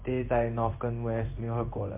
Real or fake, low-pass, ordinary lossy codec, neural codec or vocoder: fake; 3.6 kHz; MP3, 32 kbps; codec, 16 kHz in and 24 kHz out, 1 kbps, XY-Tokenizer